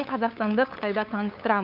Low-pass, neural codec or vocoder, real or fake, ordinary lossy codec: 5.4 kHz; codec, 16 kHz, 8 kbps, FunCodec, trained on LibriTTS, 25 frames a second; fake; none